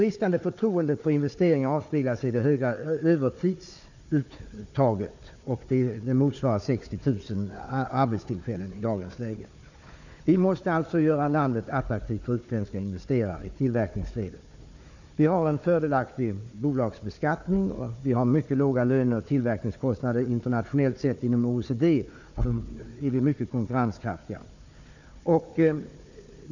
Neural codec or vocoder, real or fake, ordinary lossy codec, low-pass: codec, 16 kHz, 4 kbps, FunCodec, trained on Chinese and English, 50 frames a second; fake; none; 7.2 kHz